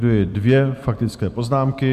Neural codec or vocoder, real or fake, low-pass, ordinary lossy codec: vocoder, 44.1 kHz, 128 mel bands every 256 samples, BigVGAN v2; fake; 14.4 kHz; MP3, 96 kbps